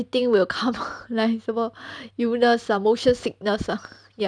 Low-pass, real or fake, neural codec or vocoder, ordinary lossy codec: 9.9 kHz; real; none; none